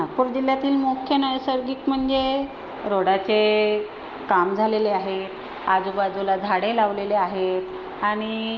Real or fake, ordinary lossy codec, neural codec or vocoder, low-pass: real; Opus, 32 kbps; none; 7.2 kHz